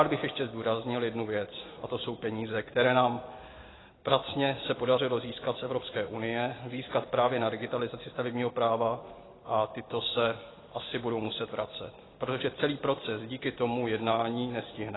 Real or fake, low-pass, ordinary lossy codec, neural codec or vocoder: real; 7.2 kHz; AAC, 16 kbps; none